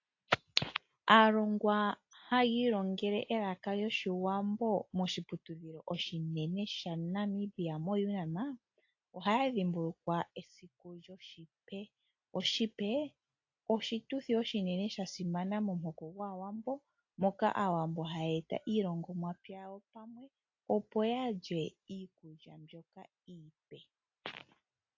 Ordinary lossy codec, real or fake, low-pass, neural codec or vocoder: AAC, 48 kbps; real; 7.2 kHz; none